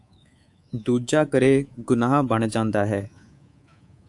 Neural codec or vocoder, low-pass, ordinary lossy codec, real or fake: codec, 24 kHz, 3.1 kbps, DualCodec; 10.8 kHz; AAC, 64 kbps; fake